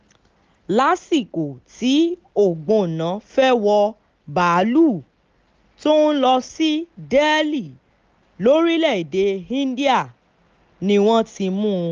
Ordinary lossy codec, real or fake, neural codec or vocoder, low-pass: Opus, 32 kbps; real; none; 7.2 kHz